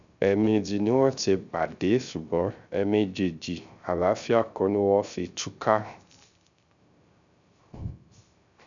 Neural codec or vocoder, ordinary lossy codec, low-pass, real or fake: codec, 16 kHz, 0.3 kbps, FocalCodec; none; 7.2 kHz; fake